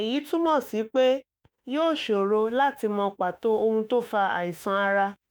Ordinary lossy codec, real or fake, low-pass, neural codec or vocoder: none; fake; none; autoencoder, 48 kHz, 32 numbers a frame, DAC-VAE, trained on Japanese speech